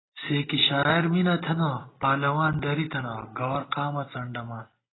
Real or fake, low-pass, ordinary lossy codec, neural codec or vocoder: real; 7.2 kHz; AAC, 16 kbps; none